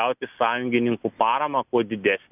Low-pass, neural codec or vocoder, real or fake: 3.6 kHz; none; real